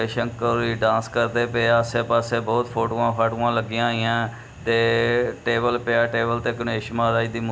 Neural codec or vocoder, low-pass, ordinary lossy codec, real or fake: none; none; none; real